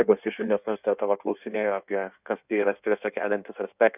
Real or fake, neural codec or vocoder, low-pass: fake; codec, 16 kHz in and 24 kHz out, 1.1 kbps, FireRedTTS-2 codec; 3.6 kHz